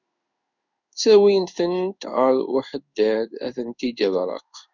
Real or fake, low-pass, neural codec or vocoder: fake; 7.2 kHz; codec, 16 kHz in and 24 kHz out, 1 kbps, XY-Tokenizer